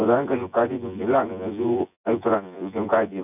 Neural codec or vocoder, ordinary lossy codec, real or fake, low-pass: vocoder, 24 kHz, 100 mel bands, Vocos; none; fake; 3.6 kHz